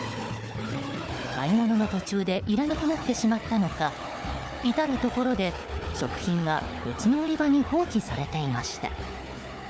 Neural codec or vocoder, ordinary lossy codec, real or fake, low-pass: codec, 16 kHz, 4 kbps, FunCodec, trained on Chinese and English, 50 frames a second; none; fake; none